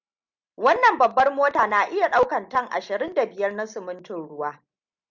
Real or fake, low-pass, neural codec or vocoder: real; 7.2 kHz; none